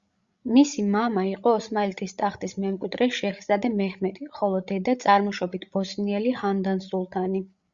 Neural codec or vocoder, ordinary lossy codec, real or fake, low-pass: codec, 16 kHz, 16 kbps, FreqCodec, larger model; Opus, 64 kbps; fake; 7.2 kHz